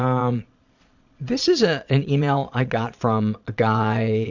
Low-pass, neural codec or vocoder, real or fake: 7.2 kHz; vocoder, 22.05 kHz, 80 mel bands, Vocos; fake